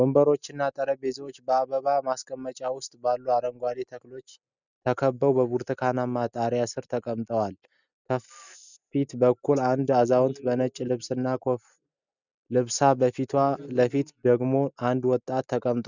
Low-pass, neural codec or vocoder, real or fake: 7.2 kHz; none; real